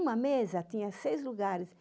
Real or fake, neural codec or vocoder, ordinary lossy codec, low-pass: real; none; none; none